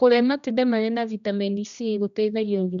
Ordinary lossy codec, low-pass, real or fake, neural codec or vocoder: none; 7.2 kHz; fake; codec, 16 kHz, 1 kbps, X-Codec, HuBERT features, trained on general audio